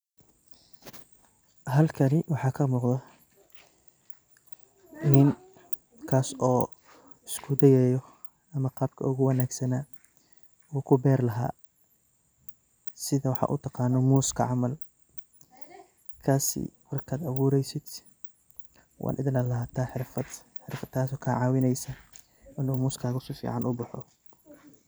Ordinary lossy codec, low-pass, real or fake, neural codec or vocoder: none; none; real; none